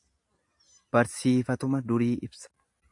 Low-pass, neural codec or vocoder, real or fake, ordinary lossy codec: 10.8 kHz; none; real; AAC, 64 kbps